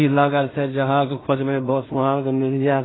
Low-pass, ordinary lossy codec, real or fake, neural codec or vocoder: 7.2 kHz; AAC, 16 kbps; fake; codec, 16 kHz in and 24 kHz out, 0.4 kbps, LongCat-Audio-Codec, two codebook decoder